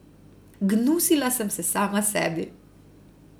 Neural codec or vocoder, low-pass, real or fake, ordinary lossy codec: none; none; real; none